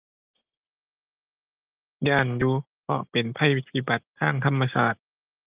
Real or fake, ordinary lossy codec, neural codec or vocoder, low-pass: real; Opus, 24 kbps; none; 3.6 kHz